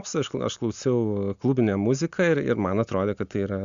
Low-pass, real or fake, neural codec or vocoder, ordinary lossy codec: 7.2 kHz; real; none; Opus, 64 kbps